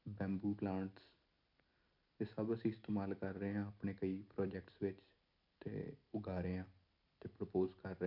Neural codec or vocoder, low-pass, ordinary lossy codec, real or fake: none; 5.4 kHz; none; real